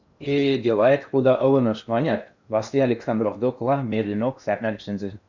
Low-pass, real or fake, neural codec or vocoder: 7.2 kHz; fake; codec, 16 kHz in and 24 kHz out, 0.8 kbps, FocalCodec, streaming, 65536 codes